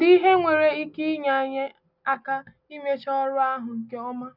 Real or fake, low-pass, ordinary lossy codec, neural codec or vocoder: real; 5.4 kHz; none; none